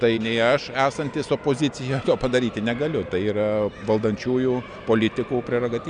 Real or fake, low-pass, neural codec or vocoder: real; 9.9 kHz; none